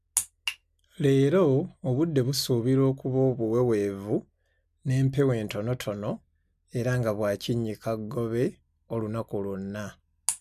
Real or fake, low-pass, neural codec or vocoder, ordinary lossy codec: real; 14.4 kHz; none; none